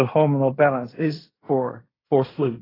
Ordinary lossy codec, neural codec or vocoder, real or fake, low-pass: AAC, 24 kbps; codec, 16 kHz in and 24 kHz out, 0.4 kbps, LongCat-Audio-Codec, fine tuned four codebook decoder; fake; 5.4 kHz